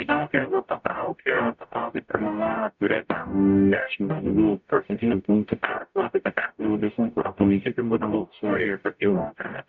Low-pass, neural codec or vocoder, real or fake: 7.2 kHz; codec, 44.1 kHz, 0.9 kbps, DAC; fake